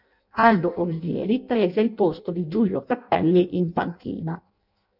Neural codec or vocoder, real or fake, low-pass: codec, 16 kHz in and 24 kHz out, 0.6 kbps, FireRedTTS-2 codec; fake; 5.4 kHz